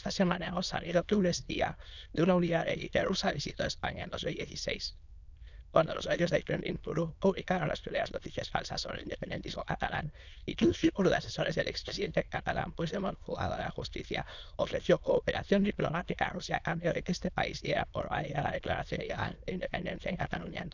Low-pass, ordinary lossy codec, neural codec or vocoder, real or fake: 7.2 kHz; none; autoencoder, 22.05 kHz, a latent of 192 numbers a frame, VITS, trained on many speakers; fake